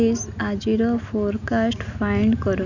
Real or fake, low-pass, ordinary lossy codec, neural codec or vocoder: real; 7.2 kHz; none; none